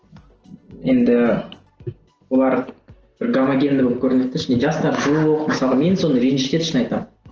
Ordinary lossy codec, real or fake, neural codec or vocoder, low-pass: Opus, 16 kbps; real; none; 7.2 kHz